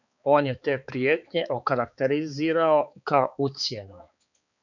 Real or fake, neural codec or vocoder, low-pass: fake; codec, 16 kHz, 4 kbps, X-Codec, HuBERT features, trained on balanced general audio; 7.2 kHz